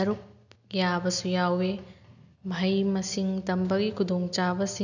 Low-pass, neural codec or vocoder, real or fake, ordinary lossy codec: 7.2 kHz; none; real; none